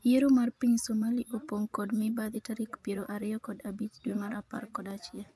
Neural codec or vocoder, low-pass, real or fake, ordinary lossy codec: vocoder, 24 kHz, 100 mel bands, Vocos; none; fake; none